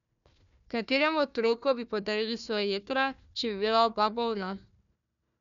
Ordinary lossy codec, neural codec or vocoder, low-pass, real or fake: none; codec, 16 kHz, 1 kbps, FunCodec, trained on Chinese and English, 50 frames a second; 7.2 kHz; fake